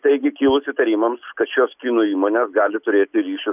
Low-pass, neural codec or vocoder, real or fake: 3.6 kHz; none; real